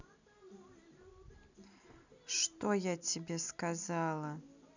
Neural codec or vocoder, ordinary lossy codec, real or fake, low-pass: none; none; real; 7.2 kHz